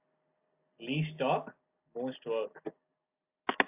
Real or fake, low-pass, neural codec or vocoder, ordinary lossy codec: real; 3.6 kHz; none; AAC, 32 kbps